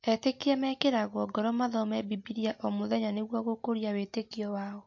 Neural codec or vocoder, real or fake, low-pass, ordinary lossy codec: none; real; 7.2 kHz; MP3, 48 kbps